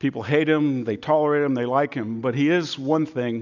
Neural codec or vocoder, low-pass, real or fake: none; 7.2 kHz; real